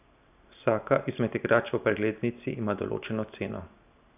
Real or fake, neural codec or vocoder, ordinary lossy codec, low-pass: real; none; none; 3.6 kHz